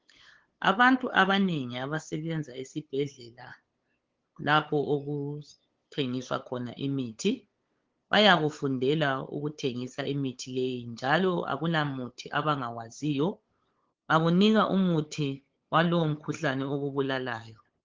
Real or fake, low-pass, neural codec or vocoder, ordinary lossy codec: fake; 7.2 kHz; codec, 16 kHz, 8 kbps, FunCodec, trained on LibriTTS, 25 frames a second; Opus, 16 kbps